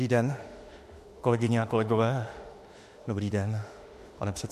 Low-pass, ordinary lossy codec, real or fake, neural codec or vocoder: 14.4 kHz; MP3, 64 kbps; fake; autoencoder, 48 kHz, 32 numbers a frame, DAC-VAE, trained on Japanese speech